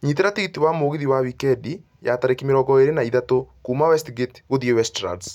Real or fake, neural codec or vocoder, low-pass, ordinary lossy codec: real; none; 19.8 kHz; none